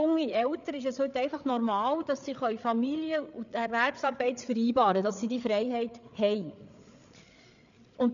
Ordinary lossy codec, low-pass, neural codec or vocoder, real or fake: none; 7.2 kHz; codec, 16 kHz, 8 kbps, FreqCodec, larger model; fake